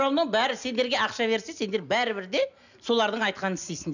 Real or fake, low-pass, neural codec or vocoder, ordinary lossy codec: real; 7.2 kHz; none; none